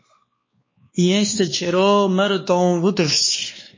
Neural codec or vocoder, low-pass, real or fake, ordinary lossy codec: codec, 16 kHz, 2 kbps, X-Codec, WavLM features, trained on Multilingual LibriSpeech; 7.2 kHz; fake; MP3, 32 kbps